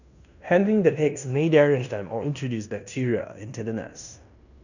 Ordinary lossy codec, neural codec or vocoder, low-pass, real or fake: none; codec, 16 kHz in and 24 kHz out, 0.9 kbps, LongCat-Audio-Codec, fine tuned four codebook decoder; 7.2 kHz; fake